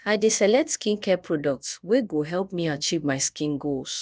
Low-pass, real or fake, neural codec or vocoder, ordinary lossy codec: none; fake; codec, 16 kHz, about 1 kbps, DyCAST, with the encoder's durations; none